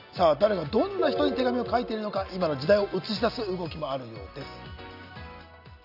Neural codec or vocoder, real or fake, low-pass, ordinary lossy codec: none; real; 5.4 kHz; none